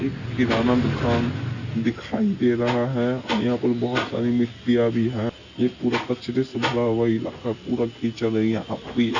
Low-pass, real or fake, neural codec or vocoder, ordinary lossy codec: 7.2 kHz; real; none; none